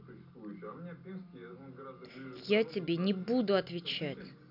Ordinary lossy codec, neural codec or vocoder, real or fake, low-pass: none; none; real; 5.4 kHz